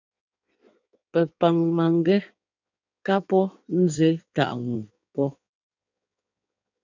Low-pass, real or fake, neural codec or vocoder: 7.2 kHz; fake; codec, 16 kHz in and 24 kHz out, 1.1 kbps, FireRedTTS-2 codec